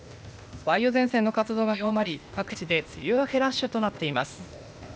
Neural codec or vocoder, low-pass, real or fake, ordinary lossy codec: codec, 16 kHz, 0.8 kbps, ZipCodec; none; fake; none